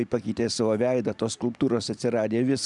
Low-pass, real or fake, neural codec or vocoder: 10.8 kHz; real; none